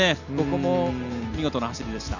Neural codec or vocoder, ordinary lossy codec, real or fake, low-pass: none; none; real; 7.2 kHz